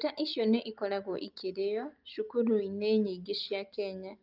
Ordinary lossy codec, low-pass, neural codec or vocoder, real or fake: Opus, 24 kbps; 5.4 kHz; none; real